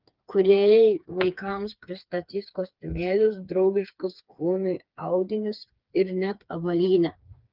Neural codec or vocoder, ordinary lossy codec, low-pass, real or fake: codec, 16 kHz, 4 kbps, FreqCodec, smaller model; Opus, 32 kbps; 5.4 kHz; fake